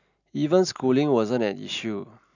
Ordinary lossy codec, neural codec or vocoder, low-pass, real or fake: none; none; 7.2 kHz; real